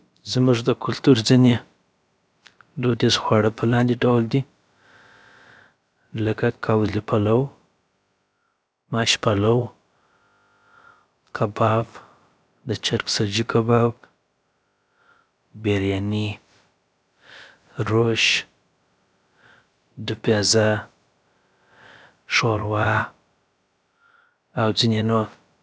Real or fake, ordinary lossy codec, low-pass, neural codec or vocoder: fake; none; none; codec, 16 kHz, about 1 kbps, DyCAST, with the encoder's durations